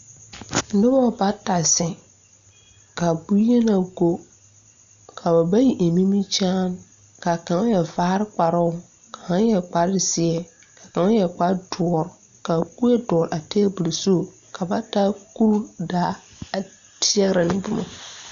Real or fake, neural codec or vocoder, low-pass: real; none; 7.2 kHz